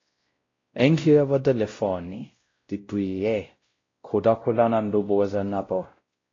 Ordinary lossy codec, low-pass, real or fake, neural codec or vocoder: AAC, 32 kbps; 7.2 kHz; fake; codec, 16 kHz, 0.5 kbps, X-Codec, WavLM features, trained on Multilingual LibriSpeech